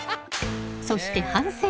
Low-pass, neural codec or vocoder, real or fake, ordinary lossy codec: none; none; real; none